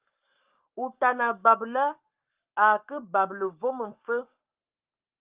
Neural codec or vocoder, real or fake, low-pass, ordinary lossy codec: codec, 44.1 kHz, 7.8 kbps, Pupu-Codec; fake; 3.6 kHz; Opus, 32 kbps